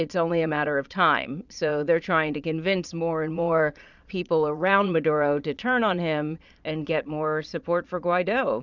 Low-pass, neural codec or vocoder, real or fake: 7.2 kHz; vocoder, 22.05 kHz, 80 mel bands, Vocos; fake